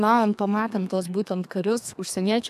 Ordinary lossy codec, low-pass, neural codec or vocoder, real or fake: AAC, 96 kbps; 14.4 kHz; codec, 32 kHz, 1.9 kbps, SNAC; fake